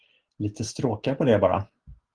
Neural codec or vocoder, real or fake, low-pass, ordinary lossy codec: none; real; 9.9 kHz; Opus, 16 kbps